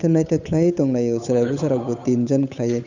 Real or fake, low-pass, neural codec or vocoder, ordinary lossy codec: fake; 7.2 kHz; codec, 16 kHz, 8 kbps, FunCodec, trained on Chinese and English, 25 frames a second; none